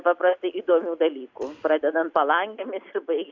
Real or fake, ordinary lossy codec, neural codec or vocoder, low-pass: real; MP3, 64 kbps; none; 7.2 kHz